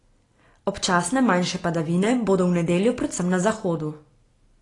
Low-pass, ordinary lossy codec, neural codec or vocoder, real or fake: 10.8 kHz; AAC, 32 kbps; none; real